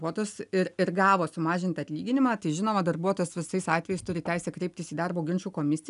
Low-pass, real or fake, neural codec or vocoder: 10.8 kHz; real; none